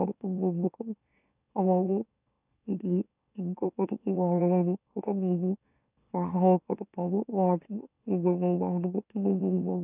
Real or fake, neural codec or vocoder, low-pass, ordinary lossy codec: fake; autoencoder, 44.1 kHz, a latent of 192 numbers a frame, MeloTTS; 3.6 kHz; none